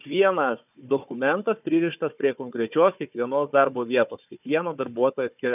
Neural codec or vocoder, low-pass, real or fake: codec, 16 kHz, 4 kbps, FunCodec, trained on Chinese and English, 50 frames a second; 3.6 kHz; fake